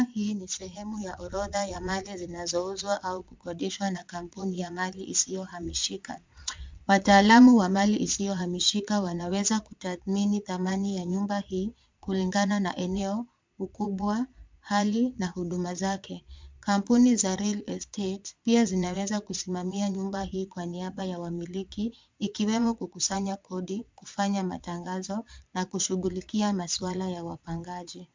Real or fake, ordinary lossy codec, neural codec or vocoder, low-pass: fake; MP3, 64 kbps; vocoder, 22.05 kHz, 80 mel bands, Vocos; 7.2 kHz